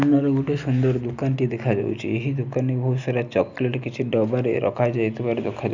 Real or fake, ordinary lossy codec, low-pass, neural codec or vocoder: real; none; 7.2 kHz; none